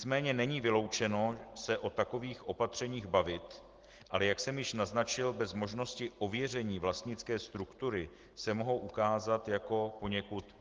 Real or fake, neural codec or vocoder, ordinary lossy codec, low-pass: real; none; Opus, 16 kbps; 7.2 kHz